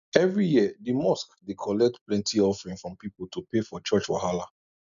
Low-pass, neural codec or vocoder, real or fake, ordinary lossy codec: 7.2 kHz; none; real; none